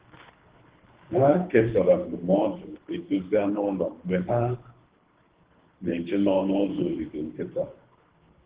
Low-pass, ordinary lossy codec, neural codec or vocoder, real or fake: 3.6 kHz; Opus, 16 kbps; codec, 24 kHz, 3 kbps, HILCodec; fake